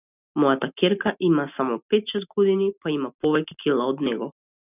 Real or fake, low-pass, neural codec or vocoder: real; 3.6 kHz; none